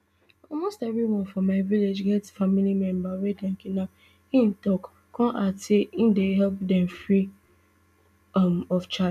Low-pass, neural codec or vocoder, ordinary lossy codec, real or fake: 14.4 kHz; none; none; real